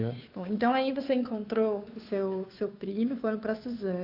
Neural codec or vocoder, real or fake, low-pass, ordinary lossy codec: codec, 16 kHz, 2 kbps, FunCodec, trained on Chinese and English, 25 frames a second; fake; 5.4 kHz; AAC, 48 kbps